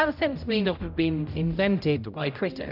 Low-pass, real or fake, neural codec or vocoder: 5.4 kHz; fake; codec, 16 kHz, 0.5 kbps, X-Codec, HuBERT features, trained on general audio